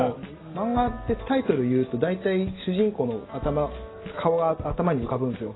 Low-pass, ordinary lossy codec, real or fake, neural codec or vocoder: 7.2 kHz; AAC, 16 kbps; real; none